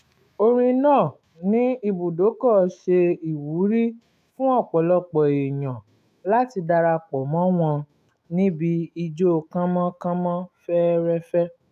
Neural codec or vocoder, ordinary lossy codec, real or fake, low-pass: autoencoder, 48 kHz, 128 numbers a frame, DAC-VAE, trained on Japanese speech; none; fake; 14.4 kHz